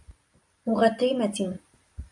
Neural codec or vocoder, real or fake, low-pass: none; real; 10.8 kHz